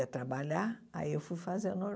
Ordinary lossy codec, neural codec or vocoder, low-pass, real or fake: none; none; none; real